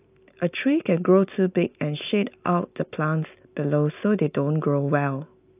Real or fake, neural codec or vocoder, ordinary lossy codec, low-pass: real; none; AAC, 32 kbps; 3.6 kHz